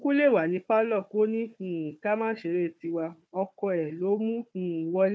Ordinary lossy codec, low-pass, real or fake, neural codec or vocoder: none; none; fake; codec, 16 kHz, 4 kbps, FunCodec, trained on Chinese and English, 50 frames a second